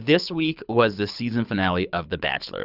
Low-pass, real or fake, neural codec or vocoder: 5.4 kHz; fake; codec, 24 kHz, 6 kbps, HILCodec